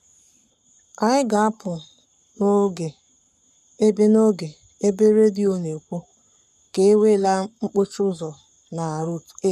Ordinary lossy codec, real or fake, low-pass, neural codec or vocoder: none; fake; 14.4 kHz; codec, 44.1 kHz, 7.8 kbps, Pupu-Codec